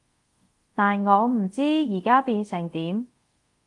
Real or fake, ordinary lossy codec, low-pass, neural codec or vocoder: fake; Opus, 24 kbps; 10.8 kHz; codec, 24 kHz, 0.5 kbps, DualCodec